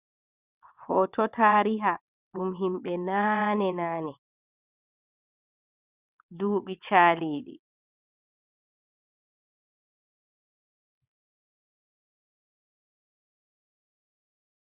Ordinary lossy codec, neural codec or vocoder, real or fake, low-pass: Opus, 64 kbps; vocoder, 22.05 kHz, 80 mel bands, WaveNeXt; fake; 3.6 kHz